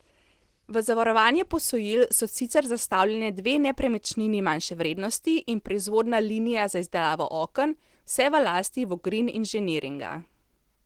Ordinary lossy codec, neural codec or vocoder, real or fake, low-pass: Opus, 16 kbps; none; real; 19.8 kHz